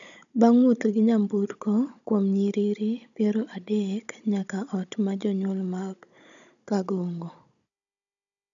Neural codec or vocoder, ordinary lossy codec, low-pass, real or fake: codec, 16 kHz, 16 kbps, FunCodec, trained on Chinese and English, 50 frames a second; none; 7.2 kHz; fake